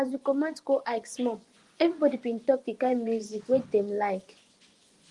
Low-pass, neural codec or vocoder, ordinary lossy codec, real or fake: 10.8 kHz; vocoder, 24 kHz, 100 mel bands, Vocos; Opus, 24 kbps; fake